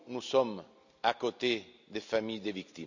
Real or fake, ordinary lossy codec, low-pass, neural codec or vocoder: real; none; 7.2 kHz; none